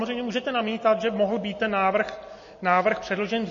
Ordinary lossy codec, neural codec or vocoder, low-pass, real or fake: MP3, 32 kbps; none; 7.2 kHz; real